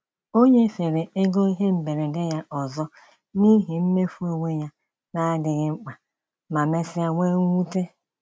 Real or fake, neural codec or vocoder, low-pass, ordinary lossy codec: real; none; none; none